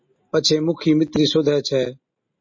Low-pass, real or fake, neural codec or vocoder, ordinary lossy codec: 7.2 kHz; real; none; MP3, 32 kbps